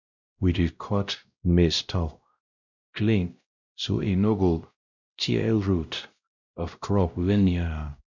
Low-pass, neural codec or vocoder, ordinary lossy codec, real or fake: 7.2 kHz; codec, 16 kHz, 0.5 kbps, X-Codec, WavLM features, trained on Multilingual LibriSpeech; none; fake